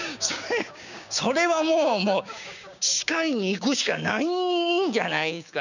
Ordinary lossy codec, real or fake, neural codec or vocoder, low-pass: none; fake; codec, 16 kHz, 6 kbps, DAC; 7.2 kHz